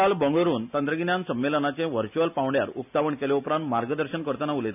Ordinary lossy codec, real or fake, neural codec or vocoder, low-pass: none; real; none; 3.6 kHz